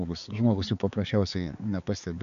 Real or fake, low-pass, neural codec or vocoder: fake; 7.2 kHz; codec, 16 kHz, 4 kbps, X-Codec, HuBERT features, trained on balanced general audio